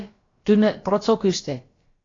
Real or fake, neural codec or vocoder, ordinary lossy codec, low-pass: fake; codec, 16 kHz, about 1 kbps, DyCAST, with the encoder's durations; AAC, 32 kbps; 7.2 kHz